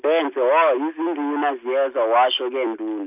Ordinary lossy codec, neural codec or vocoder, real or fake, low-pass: none; none; real; 3.6 kHz